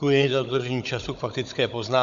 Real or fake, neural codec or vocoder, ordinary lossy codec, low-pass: fake; codec, 16 kHz, 16 kbps, FunCodec, trained on Chinese and English, 50 frames a second; MP3, 64 kbps; 7.2 kHz